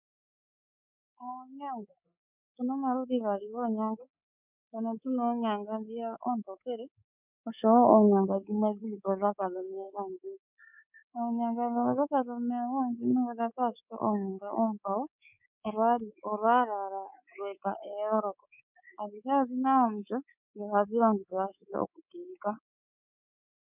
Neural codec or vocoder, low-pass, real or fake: codec, 24 kHz, 3.1 kbps, DualCodec; 3.6 kHz; fake